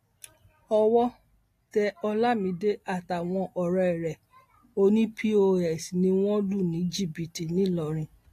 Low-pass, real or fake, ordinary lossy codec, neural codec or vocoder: 14.4 kHz; real; AAC, 48 kbps; none